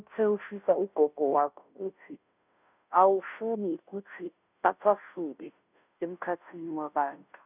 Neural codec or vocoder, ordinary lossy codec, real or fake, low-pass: codec, 16 kHz, 0.5 kbps, FunCodec, trained on Chinese and English, 25 frames a second; none; fake; 3.6 kHz